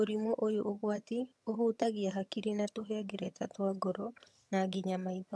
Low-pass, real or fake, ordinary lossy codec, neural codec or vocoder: none; fake; none; vocoder, 22.05 kHz, 80 mel bands, HiFi-GAN